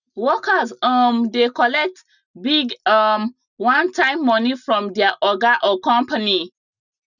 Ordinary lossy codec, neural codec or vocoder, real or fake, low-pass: none; none; real; 7.2 kHz